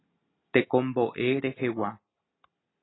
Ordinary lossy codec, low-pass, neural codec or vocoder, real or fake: AAC, 16 kbps; 7.2 kHz; none; real